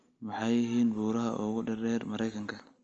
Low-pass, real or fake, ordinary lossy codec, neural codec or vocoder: 7.2 kHz; real; Opus, 24 kbps; none